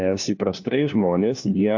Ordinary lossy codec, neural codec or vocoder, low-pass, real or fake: AAC, 48 kbps; codec, 16 kHz, 1 kbps, FunCodec, trained on LibriTTS, 50 frames a second; 7.2 kHz; fake